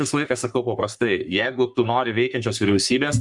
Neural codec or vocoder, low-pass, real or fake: codec, 44.1 kHz, 3.4 kbps, Pupu-Codec; 10.8 kHz; fake